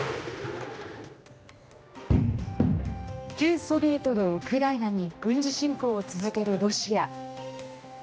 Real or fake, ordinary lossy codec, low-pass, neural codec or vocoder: fake; none; none; codec, 16 kHz, 1 kbps, X-Codec, HuBERT features, trained on general audio